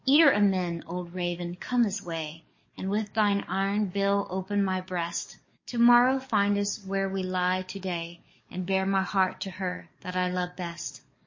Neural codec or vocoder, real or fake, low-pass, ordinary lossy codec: codec, 44.1 kHz, 7.8 kbps, DAC; fake; 7.2 kHz; MP3, 32 kbps